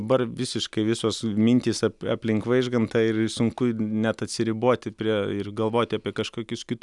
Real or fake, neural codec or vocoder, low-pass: real; none; 10.8 kHz